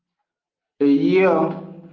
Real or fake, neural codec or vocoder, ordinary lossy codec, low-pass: real; none; Opus, 24 kbps; 7.2 kHz